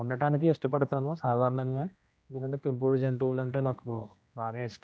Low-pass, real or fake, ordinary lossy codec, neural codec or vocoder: none; fake; none; codec, 16 kHz, 1 kbps, X-Codec, HuBERT features, trained on general audio